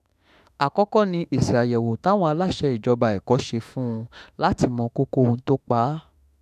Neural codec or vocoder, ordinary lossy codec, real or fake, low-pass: autoencoder, 48 kHz, 32 numbers a frame, DAC-VAE, trained on Japanese speech; none; fake; 14.4 kHz